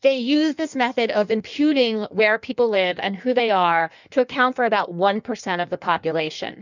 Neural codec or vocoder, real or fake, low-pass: codec, 16 kHz in and 24 kHz out, 1.1 kbps, FireRedTTS-2 codec; fake; 7.2 kHz